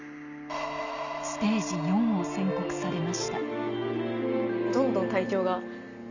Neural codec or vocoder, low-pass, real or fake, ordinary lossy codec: none; 7.2 kHz; real; none